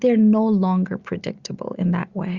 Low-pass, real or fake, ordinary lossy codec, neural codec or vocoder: 7.2 kHz; real; Opus, 64 kbps; none